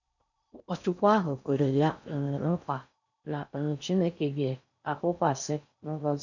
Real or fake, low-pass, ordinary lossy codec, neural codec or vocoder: fake; 7.2 kHz; none; codec, 16 kHz in and 24 kHz out, 0.6 kbps, FocalCodec, streaming, 4096 codes